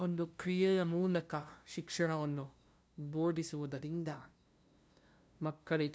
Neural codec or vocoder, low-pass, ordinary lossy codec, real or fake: codec, 16 kHz, 0.5 kbps, FunCodec, trained on LibriTTS, 25 frames a second; none; none; fake